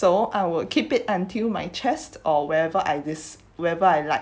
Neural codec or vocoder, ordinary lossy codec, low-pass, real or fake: none; none; none; real